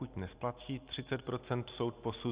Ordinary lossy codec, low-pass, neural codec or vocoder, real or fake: Opus, 64 kbps; 3.6 kHz; none; real